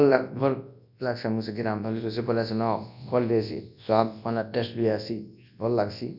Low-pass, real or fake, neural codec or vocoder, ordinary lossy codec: 5.4 kHz; fake; codec, 24 kHz, 0.9 kbps, WavTokenizer, large speech release; none